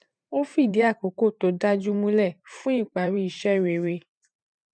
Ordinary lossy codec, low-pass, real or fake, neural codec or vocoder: none; 9.9 kHz; fake; vocoder, 44.1 kHz, 128 mel bands every 512 samples, BigVGAN v2